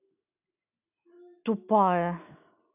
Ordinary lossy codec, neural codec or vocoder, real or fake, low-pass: none; none; real; 3.6 kHz